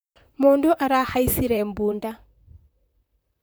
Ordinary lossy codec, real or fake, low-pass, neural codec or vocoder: none; fake; none; vocoder, 44.1 kHz, 128 mel bands, Pupu-Vocoder